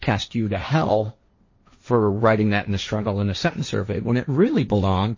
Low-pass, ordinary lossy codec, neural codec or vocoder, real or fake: 7.2 kHz; MP3, 32 kbps; codec, 16 kHz, 1.1 kbps, Voila-Tokenizer; fake